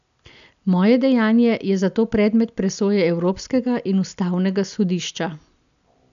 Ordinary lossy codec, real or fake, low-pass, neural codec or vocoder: none; real; 7.2 kHz; none